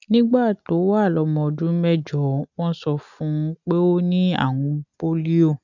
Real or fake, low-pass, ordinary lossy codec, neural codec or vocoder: real; 7.2 kHz; none; none